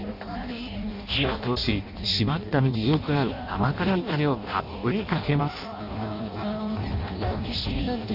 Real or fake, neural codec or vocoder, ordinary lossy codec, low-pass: fake; codec, 16 kHz in and 24 kHz out, 0.6 kbps, FireRedTTS-2 codec; none; 5.4 kHz